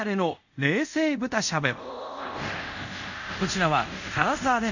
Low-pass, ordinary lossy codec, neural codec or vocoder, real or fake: 7.2 kHz; none; codec, 24 kHz, 0.5 kbps, DualCodec; fake